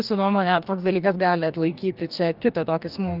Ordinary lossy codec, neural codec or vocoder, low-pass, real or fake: Opus, 24 kbps; codec, 44.1 kHz, 2.6 kbps, DAC; 5.4 kHz; fake